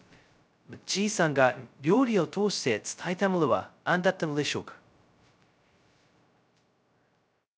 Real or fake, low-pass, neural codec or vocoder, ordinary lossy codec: fake; none; codec, 16 kHz, 0.2 kbps, FocalCodec; none